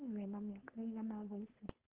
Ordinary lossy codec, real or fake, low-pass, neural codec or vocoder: Opus, 16 kbps; fake; 3.6 kHz; codec, 16 kHz, 4.8 kbps, FACodec